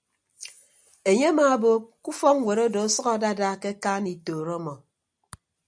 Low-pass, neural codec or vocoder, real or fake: 9.9 kHz; none; real